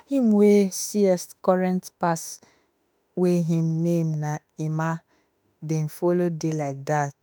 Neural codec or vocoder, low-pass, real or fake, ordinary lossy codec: autoencoder, 48 kHz, 32 numbers a frame, DAC-VAE, trained on Japanese speech; none; fake; none